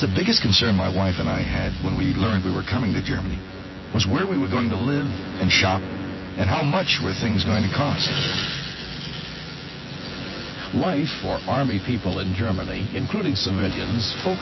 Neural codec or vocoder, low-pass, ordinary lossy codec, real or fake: vocoder, 24 kHz, 100 mel bands, Vocos; 7.2 kHz; MP3, 24 kbps; fake